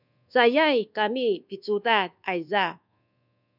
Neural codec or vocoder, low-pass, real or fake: codec, 24 kHz, 1.2 kbps, DualCodec; 5.4 kHz; fake